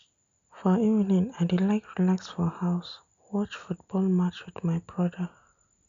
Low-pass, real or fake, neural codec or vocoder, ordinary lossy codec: 7.2 kHz; real; none; none